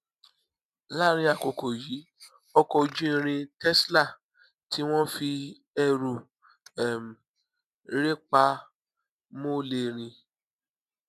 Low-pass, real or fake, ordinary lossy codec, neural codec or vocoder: 14.4 kHz; real; none; none